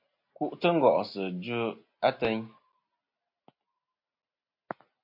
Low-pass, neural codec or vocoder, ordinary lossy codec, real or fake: 5.4 kHz; none; MP3, 32 kbps; real